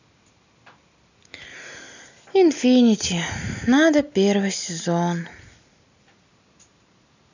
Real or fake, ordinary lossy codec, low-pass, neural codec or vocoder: real; none; 7.2 kHz; none